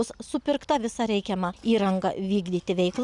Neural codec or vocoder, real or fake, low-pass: none; real; 10.8 kHz